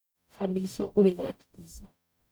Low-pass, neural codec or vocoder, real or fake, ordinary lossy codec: none; codec, 44.1 kHz, 0.9 kbps, DAC; fake; none